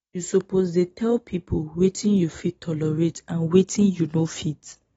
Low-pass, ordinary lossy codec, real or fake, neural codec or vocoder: 19.8 kHz; AAC, 24 kbps; real; none